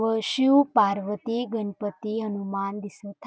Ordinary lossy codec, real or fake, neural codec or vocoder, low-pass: none; real; none; none